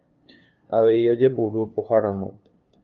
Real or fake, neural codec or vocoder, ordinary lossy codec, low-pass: fake; codec, 16 kHz, 2 kbps, FunCodec, trained on LibriTTS, 25 frames a second; Opus, 32 kbps; 7.2 kHz